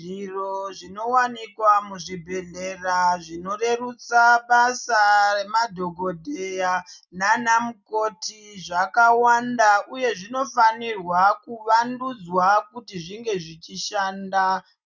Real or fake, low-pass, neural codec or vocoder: real; 7.2 kHz; none